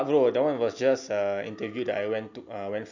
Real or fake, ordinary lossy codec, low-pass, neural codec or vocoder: real; none; 7.2 kHz; none